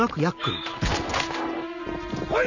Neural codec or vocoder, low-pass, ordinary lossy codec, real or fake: none; 7.2 kHz; none; real